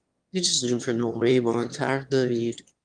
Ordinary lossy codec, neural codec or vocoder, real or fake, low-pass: Opus, 32 kbps; autoencoder, 22.05 kHz, a latent of 192 numbers a frame, VITS, trained on one speaker; fake; 9.9 kHz